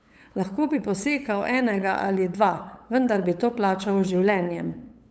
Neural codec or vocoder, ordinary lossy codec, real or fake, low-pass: codec, 16 kHz, 8 kbps, FunCodec, trained on LibriTTS, 25 frames a second; none; fake; none